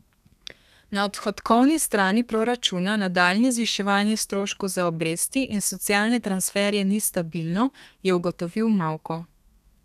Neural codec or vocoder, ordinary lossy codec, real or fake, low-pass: codec, 32 kHz, 1.9 kbps, SNAC; none; fake; 14.4 kHz